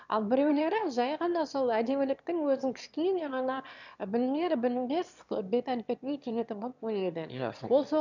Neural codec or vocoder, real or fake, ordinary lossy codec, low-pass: autoencoder, 22.05 kHz, a latent of 192 numbers a frame, VITS, trained on one speaker; fake; none; 7.2 kHz